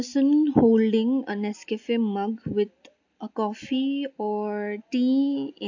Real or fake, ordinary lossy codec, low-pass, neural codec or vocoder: real; AAC, 48 kbps; 7.2 kHz; none